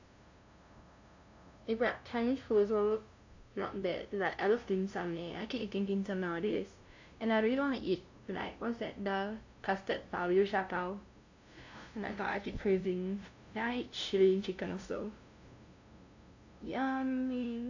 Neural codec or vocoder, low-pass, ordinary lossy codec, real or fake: codec, 16 kHz, 0.5 kbps, FunCodec, trained on LibriTTS, 25 frames a second; 7.2 kHz; AAC, 48 kbps; fake